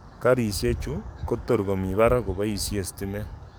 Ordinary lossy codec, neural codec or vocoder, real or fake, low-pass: none; codec, 44.1 kHz, 7.8 kbps, DAC; fake; none